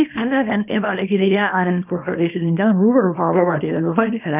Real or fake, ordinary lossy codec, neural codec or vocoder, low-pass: fake; none; codec, 24 kHz, 0.9 kbps, WavTokenizer, small release; 3.6 kHz